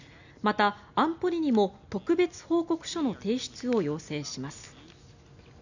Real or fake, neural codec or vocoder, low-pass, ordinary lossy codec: real; none; 7.2 kHz; none